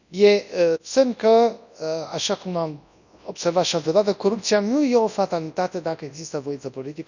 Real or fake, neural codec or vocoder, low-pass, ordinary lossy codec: fake; codec, 24 kHz, 0.9 kbps, WavTokenizer, large speech release; 7.2 kHz; none